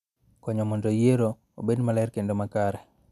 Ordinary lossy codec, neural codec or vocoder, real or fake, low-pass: none; none; real; 14.4 kHz